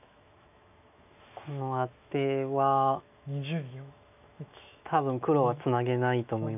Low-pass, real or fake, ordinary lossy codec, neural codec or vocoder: 3.6 kHz; fake; none; autoencoder, 48 kHz, 128 numbers a frame, DAC-VAE, trained on Japanese speech